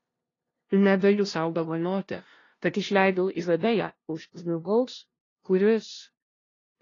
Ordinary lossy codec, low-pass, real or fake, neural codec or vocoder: AAC, 32 kbps; 7.2 kHz; fake; codec, 16 kHz, 0.5 kbps, FunCodec, trained on LibriTTS, 25 frames a second